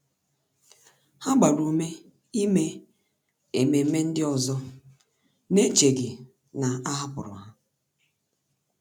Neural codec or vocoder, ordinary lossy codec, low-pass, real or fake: none; none; none; real